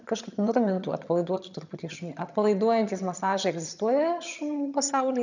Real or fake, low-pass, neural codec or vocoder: fake; 7.2 kHz; vocoder, 22.05 kHz, 80 mel bands, HiFi-GAN